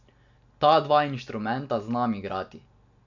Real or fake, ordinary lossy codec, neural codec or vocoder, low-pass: real; none; none; 7.2 kHz